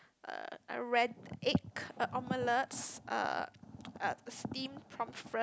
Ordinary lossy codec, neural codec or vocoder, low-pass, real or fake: none; none; none; real